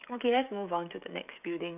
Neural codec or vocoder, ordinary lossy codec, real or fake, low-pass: codec, 16 kHz, 16 kbps, FreqCodec, smaller model; none; fake; 3.6 kHz